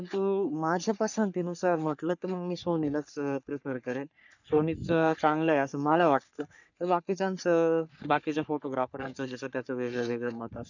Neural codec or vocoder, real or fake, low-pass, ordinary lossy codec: codec, 44.1 kHz, 3.4 kbps, Pupu-Codec; fake; 7.2 kHz; none